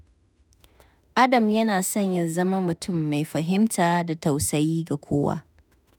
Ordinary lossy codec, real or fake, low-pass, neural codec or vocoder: none; fake; none; autoencoder, 48 kHz, 32 numbers a frame, DAC-VAE, trained on Japanese speech